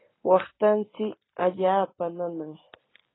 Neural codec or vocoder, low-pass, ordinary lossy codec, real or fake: none; 7.2 kHz; AAC, 16 kbps; real